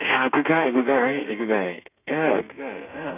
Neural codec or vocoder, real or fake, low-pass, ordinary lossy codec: codec, 32 kHz, 1.9 kbps, SNAC; fake; 3.6 kHz; none